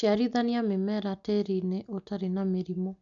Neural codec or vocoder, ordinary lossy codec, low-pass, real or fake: none; none; 7.2 kHz; real